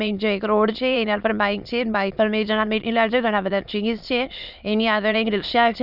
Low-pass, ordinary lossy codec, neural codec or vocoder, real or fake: 5.4 kHz; none; autoencoder, 22.05 kHz, a latent of 192 numbers a frame, VITS, trained on many speakers; fake